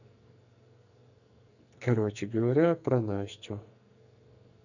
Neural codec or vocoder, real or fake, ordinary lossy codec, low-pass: codec, 44.1 kHz, 2.6 kbps, SNAC; fake; none; 7.2 kHz